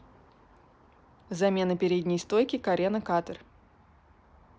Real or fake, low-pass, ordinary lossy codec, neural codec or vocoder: real; none; none; none